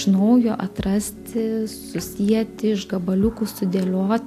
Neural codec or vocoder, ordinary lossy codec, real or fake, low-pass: none; AAC, 64 kbps; real; 14.4 kHz